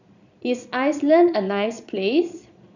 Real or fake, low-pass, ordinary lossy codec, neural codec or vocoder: fake; 7.2 kHz; none; vocoder, 22.05 kHz, 80 mel bands, Vocos